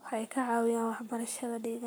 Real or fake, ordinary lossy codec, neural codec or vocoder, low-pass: fake; none; codec, 44.1 kHz, 7.8 kbps, Pupu-Codec; none